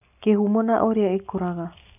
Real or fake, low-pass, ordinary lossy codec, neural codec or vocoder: real; 3.6 kHz; none; none